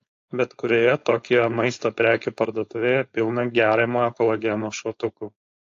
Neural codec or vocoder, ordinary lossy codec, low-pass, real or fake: codec, 16 kHz, 4.8 kbps, FACodec; AAC, 48 kbps; 7.2 kHz; fake